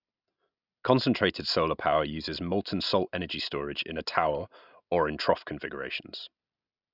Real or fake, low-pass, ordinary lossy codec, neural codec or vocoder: real; 5.4 kHz; Opus, 64 kbps; none